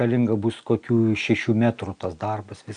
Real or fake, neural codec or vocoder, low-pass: real; none; 9.9 kHz